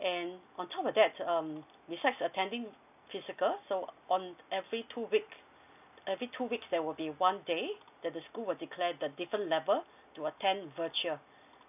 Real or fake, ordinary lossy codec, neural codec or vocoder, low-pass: real; none; none; 3.6 kHz